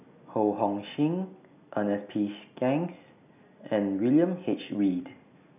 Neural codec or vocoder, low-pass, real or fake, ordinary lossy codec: none; 3.6 kHz; real; none